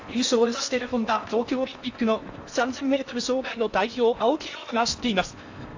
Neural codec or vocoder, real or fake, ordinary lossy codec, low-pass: codec, 16 kHz in and 24 kHz out, 0.8 kbps, FocalCodec, streaming, 65536 codes; fake; AAC, 48 kbps; 7.2 kHz